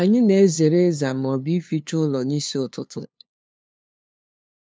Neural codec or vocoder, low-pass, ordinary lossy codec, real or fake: codec, 16 kHz, 2 kbps, FunCodec, trained on LibriTTS, 25 frames a second; none; none; fake